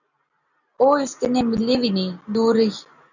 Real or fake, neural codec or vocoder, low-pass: real; none; 7.2 kHz